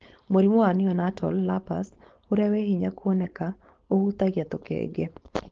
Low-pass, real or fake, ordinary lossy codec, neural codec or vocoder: 7.2 kHz; fake; Opus, 16 kbps; codec, 16 kHz, 4.8 kbps, FACodec